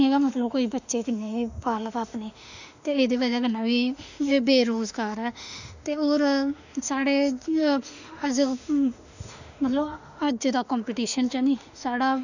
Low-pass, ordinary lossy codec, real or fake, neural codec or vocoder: 7.2 kHz; none; fake; autoencoder, 48 kHz, 32 numbers a frame, DAC-VAE, trained on Japanese speech